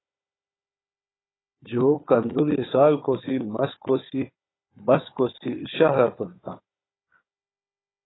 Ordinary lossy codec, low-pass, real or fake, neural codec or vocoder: AAC, 16 kbps; 7.2 kHz; fake; codec, 16 kHz, 4 kbps, FunCodec, trained on Chinese and English, 50 frames a second